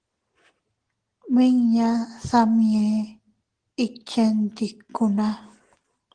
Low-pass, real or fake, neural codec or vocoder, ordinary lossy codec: 9.9 kHz; real; none; Opus, 16 kbps